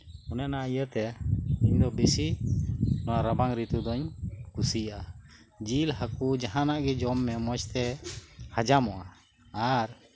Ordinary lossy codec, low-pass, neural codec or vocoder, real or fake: none; none; none; real